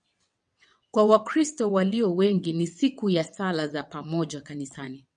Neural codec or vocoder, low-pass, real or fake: vocoder, 22.05 kHz, 80 mel bands, WaveNeXt; 9.9 kHz; fake